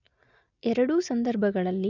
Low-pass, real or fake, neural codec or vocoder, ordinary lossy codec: 7.2 kHz; real; none; none